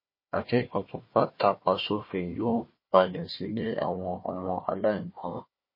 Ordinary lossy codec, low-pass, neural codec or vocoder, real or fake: MP3, 24 kbps; 5.4 kHz; codec, 16 kHz, 1 kbps, FunCodec, trained on Chinese and English, 50 frames a second; fake